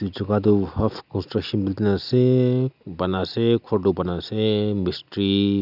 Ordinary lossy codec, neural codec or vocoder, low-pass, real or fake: none; none; 5.4 kHz; real